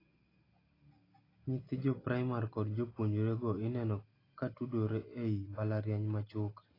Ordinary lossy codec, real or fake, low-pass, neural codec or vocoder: AAC, 24 kbps; real; 5.4 kHz; none